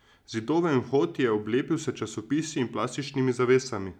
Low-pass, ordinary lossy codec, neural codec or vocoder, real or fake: 19.8 kHz; none; none; real